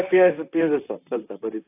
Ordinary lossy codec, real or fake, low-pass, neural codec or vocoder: AAC, 24 kbps; fake; 3.6 kHz; vocoder, 44.1 kHz, 128 mel bands, Pupu-Vocoder